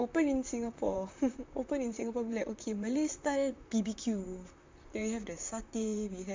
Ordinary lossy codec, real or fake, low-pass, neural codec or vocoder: MP3, 64 kbps; fake; 7.2 kHz; vocoder, 44.1 kHz, 128 mel bands, Pupu-Vocoder